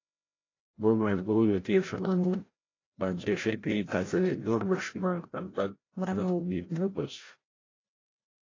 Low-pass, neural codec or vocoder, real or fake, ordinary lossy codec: 7.2 kHz; codec, 16 kHz, 0.5 kbps, FreqCodec, larger model; fake; AAC, 32 kbps